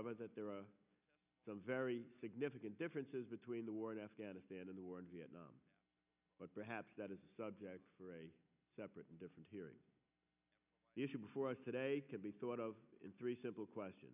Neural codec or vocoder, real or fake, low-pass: none; real; 3.6 kHz